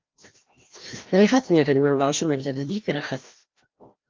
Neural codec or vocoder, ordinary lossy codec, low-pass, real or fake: codec, 16 kHz, 1 kbps, FreqCodec, larger model; Opus, 24 kbps; 7.2 kHz; fake